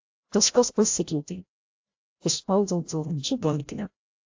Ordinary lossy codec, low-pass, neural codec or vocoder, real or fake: AAC, 48 kbps; 7.2 kHz; codec, 16 kHz, 0.5 kbps, FreqCodec, larger model; fake